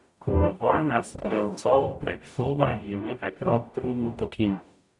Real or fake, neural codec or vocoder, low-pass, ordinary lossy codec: fake; codec, 44.1 kHz, 0.9 kbps, DAC; 10.8 kHz; none